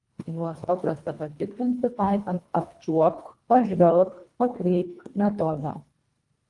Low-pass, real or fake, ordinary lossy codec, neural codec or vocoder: 10.8 kHz; fake; Opus, 32 kbps; codec, 24 kHz, 1.5 kbps, HILCodec